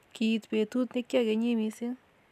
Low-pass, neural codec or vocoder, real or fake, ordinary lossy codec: 14.4 kHz; none; real; none